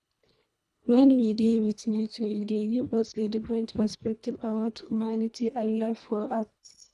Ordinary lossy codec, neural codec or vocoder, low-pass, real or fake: none; codec, 24 kHz, 1.5 kbps, HILCodec; none; fake